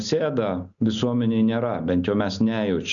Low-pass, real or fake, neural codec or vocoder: 7.2 kHz; real; none